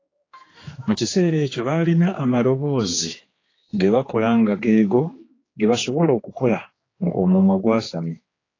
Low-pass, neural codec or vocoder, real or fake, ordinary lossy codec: 7.2 kHz; codec, 16 kHz, 2 kbps, X-Codec, HuBERT features, trained on general audio; fake; AAC, 32 kbps